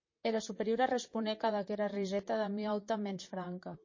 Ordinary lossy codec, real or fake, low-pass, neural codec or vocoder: MP3, 32 kbps; fake; 7.2 kHz; vocoder, 44.1 kHz, 128 mel bands, Pupu-Vocoder